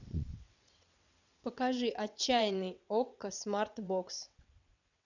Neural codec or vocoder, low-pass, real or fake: vocoder, 22.05 kHz, 80 mel bands, WaveNeXt; 7.2 kHz; fake